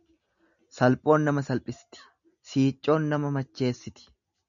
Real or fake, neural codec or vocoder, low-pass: real; none; 7.2 kHz